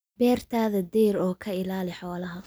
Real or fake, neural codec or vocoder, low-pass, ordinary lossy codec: real; none; none; none